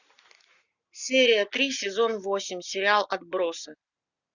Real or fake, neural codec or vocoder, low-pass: real; none; 7.2 kHz